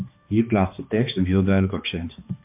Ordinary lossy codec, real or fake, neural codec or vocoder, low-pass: MP3, 32 kbps; fake; codec, 16 kHz, 2 kbps, X-Codec, HuBERT features, trained on balanced general audio; 3.6 kHz